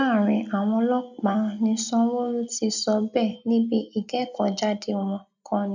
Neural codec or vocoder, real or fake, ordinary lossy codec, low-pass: none; real; none; 7.2 kHz